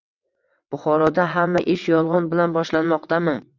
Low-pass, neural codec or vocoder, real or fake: 7.2 kHz; vocoder, 22.05 kHz, 80 mel bands, WaveNeXt; fake